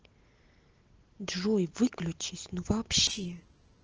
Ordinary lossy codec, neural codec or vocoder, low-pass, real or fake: Opus, 16 kbps; none; 7.2 kHz; real